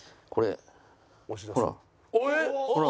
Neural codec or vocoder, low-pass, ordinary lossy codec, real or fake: none; none; none; real